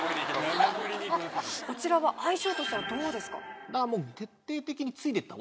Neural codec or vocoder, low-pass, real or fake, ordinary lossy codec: none; none; real; none